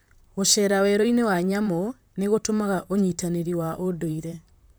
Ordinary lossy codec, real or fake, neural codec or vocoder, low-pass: none; fake; vocoder, 44.1 kHz, 128 mel bands, Pupu-Vocoder; none